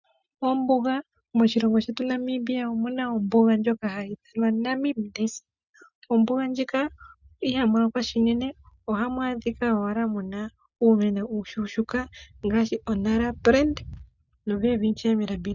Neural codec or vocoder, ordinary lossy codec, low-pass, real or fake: none; Opus, 64 kbps; 7.2 kHz; real